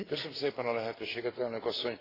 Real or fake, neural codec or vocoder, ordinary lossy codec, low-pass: real; none; AAC, 24 kbps; 5.4 kHz